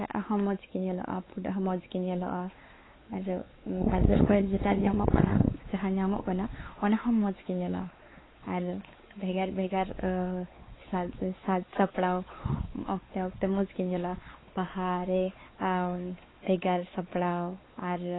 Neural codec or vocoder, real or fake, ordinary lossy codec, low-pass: codec, 16 kHz, 4 kbps, X-Codec, WavLM features, trained on Multilingual LibriSpeech; fake; AAC, 16 kbps; 7.2 kHz